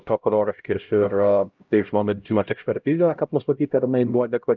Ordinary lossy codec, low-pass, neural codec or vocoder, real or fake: Opus, 24 kbps; 7.2 kHz; codec, 16 kHz, 0.5 kbps, X-Codec, HuBERT features, trained on LibriSpeech; fake